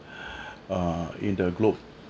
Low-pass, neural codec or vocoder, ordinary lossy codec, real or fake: none; none; none; real